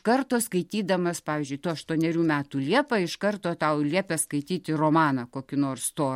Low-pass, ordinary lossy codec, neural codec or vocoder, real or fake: 19.8 kHz; MP3, 64 kbps; none; real